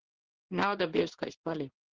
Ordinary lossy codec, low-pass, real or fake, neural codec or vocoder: Opus, 16 kbps; 7.2 kHz; fake; codec, 16 kHz in and 24 kHz out, 1 kbps, XY-Tokenizer